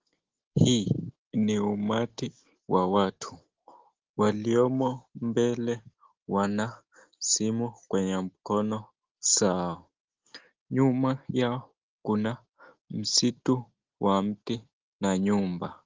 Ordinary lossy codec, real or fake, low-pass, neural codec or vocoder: Opus, 16 kbps; real; 7.2 kHz; none